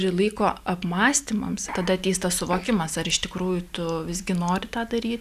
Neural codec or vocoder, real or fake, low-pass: none; real; 14.4 kHz